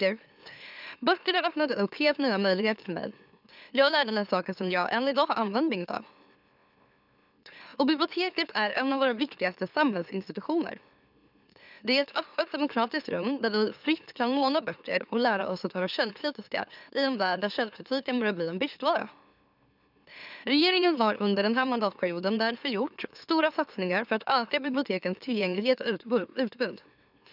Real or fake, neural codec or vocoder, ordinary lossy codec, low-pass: fake; autoencoder, 44.1 kHz, a latent of 192 numbers a frame, MeloTTS; none; 5.4 kHz